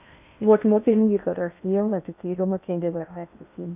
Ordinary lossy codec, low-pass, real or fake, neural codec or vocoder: none; 3.6 kHz; fake; codec, 16 kHz in and 24 kHz out, 0.6 kbps, FocalCodec, streaming, 2048 codes